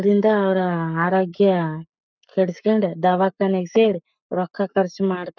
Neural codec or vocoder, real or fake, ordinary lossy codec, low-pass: codec, 44.1 kHz, 7.8 kbps, Pupu-Codec; fake; none; 7.2 kHz